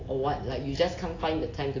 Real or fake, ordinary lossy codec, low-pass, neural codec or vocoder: real; AAC, 32 kbps; 7.2 kHz; none